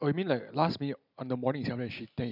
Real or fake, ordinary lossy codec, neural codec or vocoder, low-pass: real; none; none; 5.4 kHz